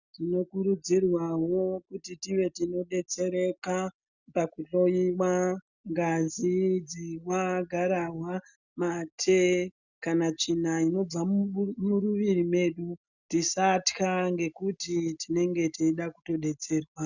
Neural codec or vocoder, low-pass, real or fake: none; 7.2 kHz; real